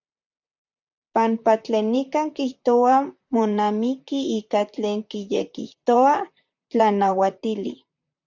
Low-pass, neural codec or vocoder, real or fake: 7.2 kHz; vocoder, 44.1 kHz, 128 mel bands, Pupu-Vocoder; fake